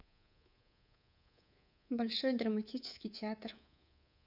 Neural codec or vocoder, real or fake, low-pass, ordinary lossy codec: codec, 24 kHz, 3.1 kbps, DualCodec; fake; 5.4 kHz; none